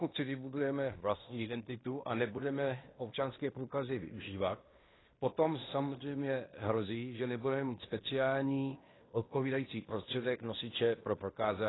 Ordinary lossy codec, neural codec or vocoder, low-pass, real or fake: AAC, 16 kbps; codec, 16 kHz in and 24 kHz out, 0.9 kbps, LongCat-Audio-Codec, fine tuned four codebook decoder; 7.2 kHz; fake